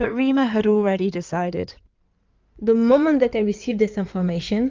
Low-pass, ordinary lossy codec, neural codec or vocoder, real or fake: 7.2 kHz; Opus, 24 kbps; codec, 16 kHz in and 24 kHz out, 2.2 kbps, FireRedTTS-2 codec; fake